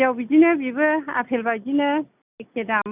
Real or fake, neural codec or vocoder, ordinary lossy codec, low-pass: real; none; none; 3.6 kHz